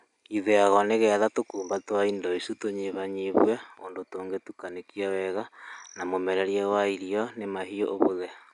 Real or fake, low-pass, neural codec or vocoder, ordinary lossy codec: real; 10.8 kHz; none; none